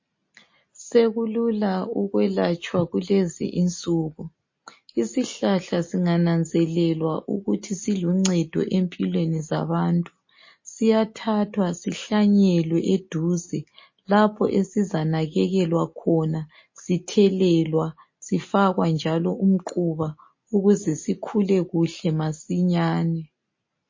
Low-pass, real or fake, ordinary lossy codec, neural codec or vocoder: 7.2 kHz; real; MP3, 32 kbps; none